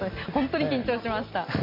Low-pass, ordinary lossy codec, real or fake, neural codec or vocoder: 5.4 kHz; MP3, 32 kbps; real; none